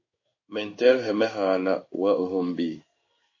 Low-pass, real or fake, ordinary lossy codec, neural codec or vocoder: 7.2 kHz; fake; MP3, 32 kbps; codec, 16 kHz in and 24 kHz out, 1 kbps, XY-Tokenizer